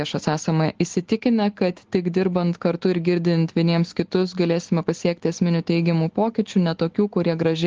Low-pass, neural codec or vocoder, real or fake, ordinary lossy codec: 7.2 kHz; none; real; Opus, 16 kbps